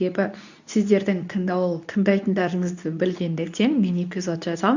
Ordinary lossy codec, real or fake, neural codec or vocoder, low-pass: none; fake; codec, 24 kHz, 0.9 kbps, WavTokenizer, medium speech release version 2; 7.2 kHz